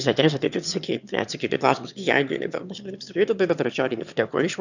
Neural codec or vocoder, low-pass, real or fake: autoencoder, 22.05 kHz, a latent of 192 numbers a frame, VITS, trained on one speaker; 7.2 kHz; fake